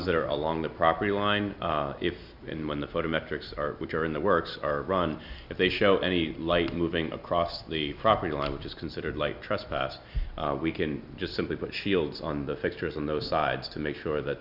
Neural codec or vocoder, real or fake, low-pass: none; real; 5.4 kHz